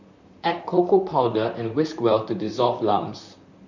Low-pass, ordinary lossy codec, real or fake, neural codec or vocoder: 7.2 kHz; none; fake; vocoder, 44.1 kHz, 128 mel bands, Pupu-Vocoder